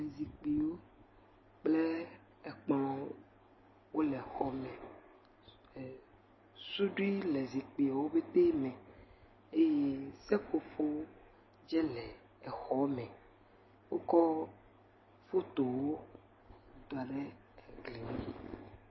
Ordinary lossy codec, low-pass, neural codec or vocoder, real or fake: MP3, 24 kbps; 7.2 kHz; none; real